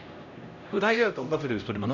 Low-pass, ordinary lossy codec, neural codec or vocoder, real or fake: 7.2 kHz; none; codec, 16 kHz, 0.5 kbps, X-Codec, HuBERT features, trained on LibriSpeech; fake